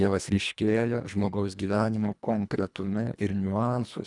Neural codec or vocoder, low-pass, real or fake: codec, 24 kHz, 1.5 kbps, HILCodec; 10.8 kHz; fake